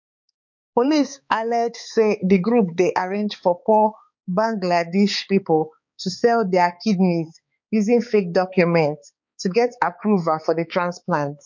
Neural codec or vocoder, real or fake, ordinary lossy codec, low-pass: codec, 16 kHz, 4 kbps, X-Codec, HuBERT features, trained on balanced general audio; fake; MP3, 48 kbps; 7.2 kHz